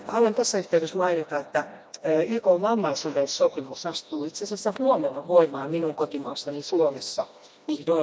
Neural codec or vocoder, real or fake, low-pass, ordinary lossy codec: codec, 16 kHz, 1 kbps, FreqCodec, smaller model; fake; none; none